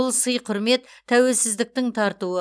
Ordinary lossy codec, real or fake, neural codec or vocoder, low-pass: none; real; none; none